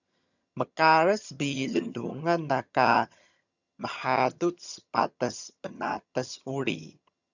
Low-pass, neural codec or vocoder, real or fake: 7.2 kHz; vocoder, 22.05 kHz, 80 mel bands, HiFi-GAN; fake